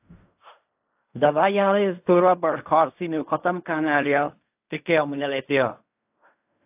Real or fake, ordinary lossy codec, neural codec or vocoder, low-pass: fake; AAC, 32 kbps; codec, 16 kHz in and 24 kHz out, 0.4 kbps, LongCat-Audio-Codec, fine tuned four codebook decoder; 3.6 kHz